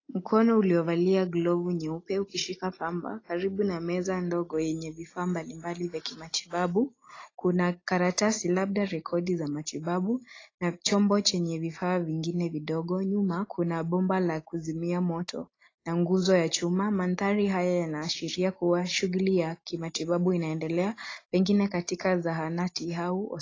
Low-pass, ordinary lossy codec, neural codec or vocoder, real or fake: 7.2 kHz; AAC, 32 kbps; none; real